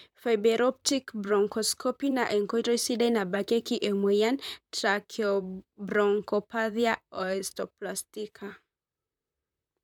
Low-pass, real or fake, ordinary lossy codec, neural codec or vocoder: 19.8 kHz; fake; MP3, 96 kbps; vocoder, 44.1 kHz, 128 mel bands, Pupu-Vocoder